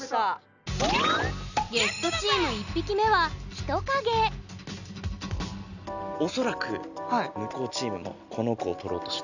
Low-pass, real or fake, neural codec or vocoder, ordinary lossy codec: 7.2 kHz; real; none; none